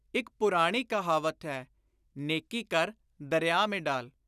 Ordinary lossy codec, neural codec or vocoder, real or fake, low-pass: none; none; real; 14.4 kHz